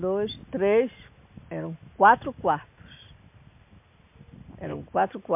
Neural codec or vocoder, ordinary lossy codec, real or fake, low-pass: none; MP3, 32 kbps; real; 3.6 kHz